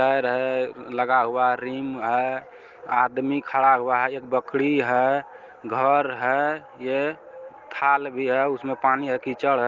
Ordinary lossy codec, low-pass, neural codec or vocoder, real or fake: Opus, 16 kbps; 7.2 kHz; none; real